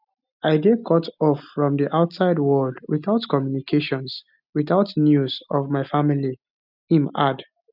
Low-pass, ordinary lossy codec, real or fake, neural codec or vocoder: 5.4 kHz; none; real; none